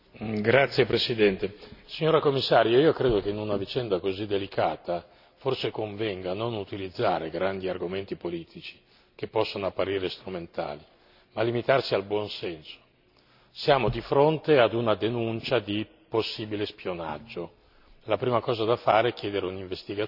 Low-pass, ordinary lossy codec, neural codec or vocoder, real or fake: 5.4 kHz; none; none; real